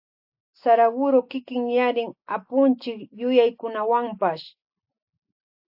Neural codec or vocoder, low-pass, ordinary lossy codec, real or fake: none; 5.4 kHz; AAC, 48 kbps; real